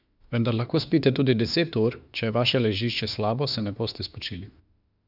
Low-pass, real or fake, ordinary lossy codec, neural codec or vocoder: 5.4 kHz; fake; none; autoencoder, 48 kHz, 32 numbers a frame, DAC-VAE, trained on Japanese speech